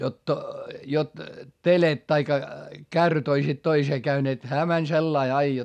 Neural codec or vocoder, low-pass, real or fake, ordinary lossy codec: none; 14.4 kHz; real; none